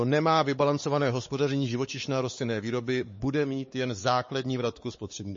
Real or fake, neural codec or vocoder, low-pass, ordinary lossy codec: fake; codec, 16 kHz, 4 kbps, X-Codec, WavLM features, trained on Multilingual LibriSpeech; 7.2 kHz; MP3, 32 kbps